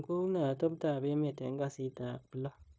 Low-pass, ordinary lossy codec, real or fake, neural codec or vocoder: none; none; fake; codec, 16 kHz, 0.9 kbps, LongCat-Audio-Codec